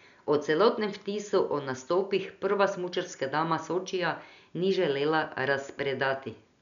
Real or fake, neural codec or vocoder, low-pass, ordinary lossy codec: real; none; 7.2 kHz; none